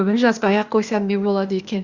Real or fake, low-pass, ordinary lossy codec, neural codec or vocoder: fake; 7.2 kHz; Opus, 64 kbps; codec, 16 kHz, 0.8 kbps, ZipCodec